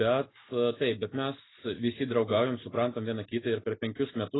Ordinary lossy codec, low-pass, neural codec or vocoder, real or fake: AAC, 16 kbps; 7.2 kHz; none; real